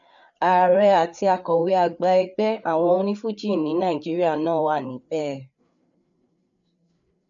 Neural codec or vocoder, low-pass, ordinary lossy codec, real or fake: codec, 16 kHz, 4 kbps, FreqCodec, larger model; 7.2 kHz; none; fake